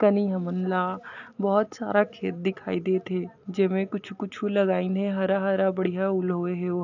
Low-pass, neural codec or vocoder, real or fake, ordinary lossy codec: 7.2 kHz; none; real; AAC, 48 kbps